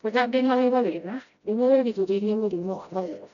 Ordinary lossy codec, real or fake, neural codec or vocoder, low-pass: none; fake; codec, 16 kHz, 0.5 kbps, FreqCodec, smaller model; 7.2 kHz